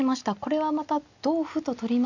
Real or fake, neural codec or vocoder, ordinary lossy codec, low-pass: real; none; none; 7.2 kHz